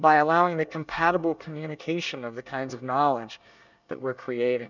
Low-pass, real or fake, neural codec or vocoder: 7.2 kHz; fake; codec, 24 kHz, 1 kbps, SNAC